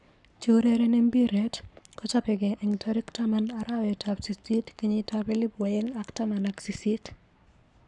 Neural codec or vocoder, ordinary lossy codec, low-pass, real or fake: codec, 44.1 kHz, 7.8 kbps, Pupu-Codec; none; 10.8 kHz; fake